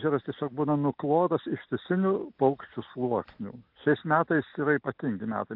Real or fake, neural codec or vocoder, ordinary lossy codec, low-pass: real; none; AAC, 48 kbps; 5.4 kHz